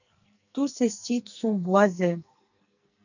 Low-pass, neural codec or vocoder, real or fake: 7.2 kHz; codec, 44.1 kHz, 2.6 kbps, SNAC; fake